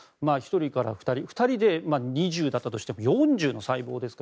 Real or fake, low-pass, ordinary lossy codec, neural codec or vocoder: real; none; none; none